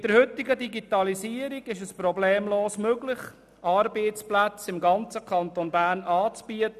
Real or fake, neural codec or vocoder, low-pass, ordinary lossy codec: real; none; 14.4 kHz; none